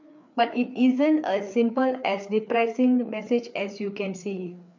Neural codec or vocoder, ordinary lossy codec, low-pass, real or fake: codec, 16 kHz, 4 kbps, FreqCodec, larger model; MP3, 64 kbps; 7.2 kHz; fake